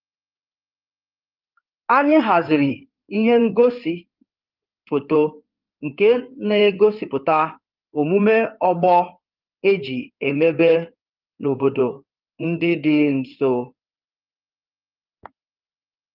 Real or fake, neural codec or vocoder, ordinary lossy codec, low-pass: fake; codec, 16 kHz in and 24 kHz out, 2.2 kbps, FireRedTTS-2 codec; Opus, 32 kbps; 5.4 kHz